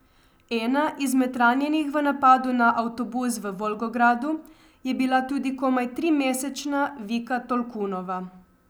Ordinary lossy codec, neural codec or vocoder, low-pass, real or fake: none; none; none; real